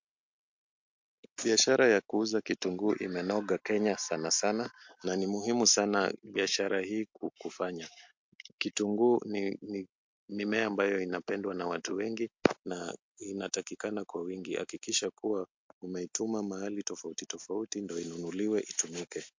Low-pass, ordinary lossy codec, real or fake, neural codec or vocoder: 7.2 kHz; MP3, 48 kbps; real; none